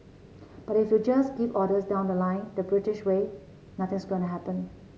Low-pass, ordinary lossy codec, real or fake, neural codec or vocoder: none; none; real; none